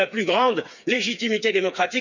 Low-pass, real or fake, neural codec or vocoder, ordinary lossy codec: 7.2 kHz; fake; codec, 16 kHz, 4 kbps, FreqCodec, smaller model; none